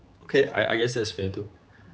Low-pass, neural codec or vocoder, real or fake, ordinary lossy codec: none; codec, 16 kHz, 4 kbps, X-Codec, HuBERT features, trained on general audio; fake; none